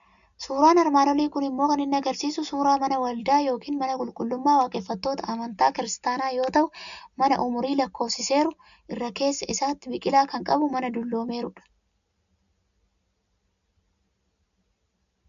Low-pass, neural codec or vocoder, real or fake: 7.2 kHz; none; real